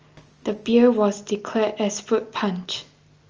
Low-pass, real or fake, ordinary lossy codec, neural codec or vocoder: 7.2 kHz; real; Opus, 24 kbps; none